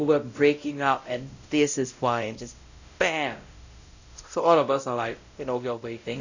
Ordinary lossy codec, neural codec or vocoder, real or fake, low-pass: none; codec, 16 kHz, 0.5 kbps, X-Codec, WavLM features, trained on Multilingual LibriSpeech; fake; 7.2 kHz